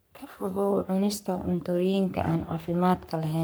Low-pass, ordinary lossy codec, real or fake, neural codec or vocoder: none; none; fake; codec, 44.1 kHz, 3.4 kbps, Pupu-Codec